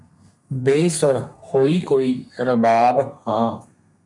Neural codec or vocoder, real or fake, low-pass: codec, 32 kHz, 1.9 kbps, SNAC; fake; 10.8 kHz